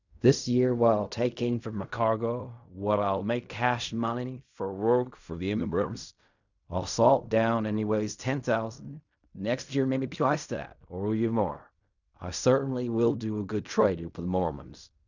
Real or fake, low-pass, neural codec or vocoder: fake; 7.2 kHz; codec, 16 kHz in and 24 kHz out, 0.4 kbps, LongCat-Audio-Codec, fine tuned four codebook decoder